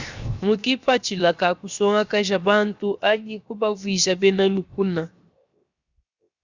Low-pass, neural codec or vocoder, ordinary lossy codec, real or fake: 7.2 kHz; codec, 16 kHz, 0.7 kbps, FocalCodec; Opus, 64 kbps; fake